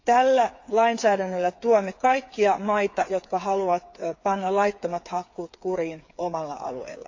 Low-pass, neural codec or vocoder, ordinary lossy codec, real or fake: 7.2 kHz; codec, 16 kHz, 8 kbps, FreqCodec, smaller model; AAC, 48 kbps; fake